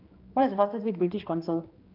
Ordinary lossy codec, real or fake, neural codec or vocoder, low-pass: Opus, 24 kbps; fake; codec, 16 kHz, 2 kbps, X-Codec, HuBERT features, trained on general audio; 5.4 kHz